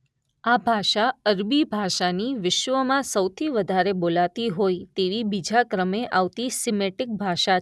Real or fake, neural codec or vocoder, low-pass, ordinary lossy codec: real; none; none; none